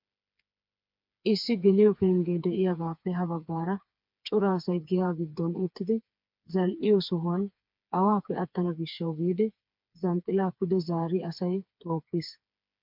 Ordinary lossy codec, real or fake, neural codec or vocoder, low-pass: MP3, 48 kbps; fake; codec, 16 kHz, 4 kbps, FreqCodec, smaller model; 5.4 kHz